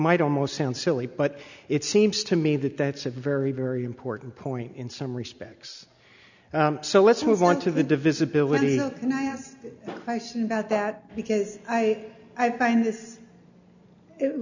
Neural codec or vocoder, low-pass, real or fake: none; 7.2 kHz; real